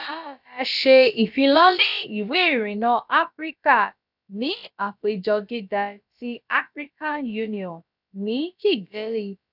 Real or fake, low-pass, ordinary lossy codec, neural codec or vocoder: fake; 5.4 kHz; none; codec, 16 kHz, about 1 kbps, DyCAST, with the encoder's durations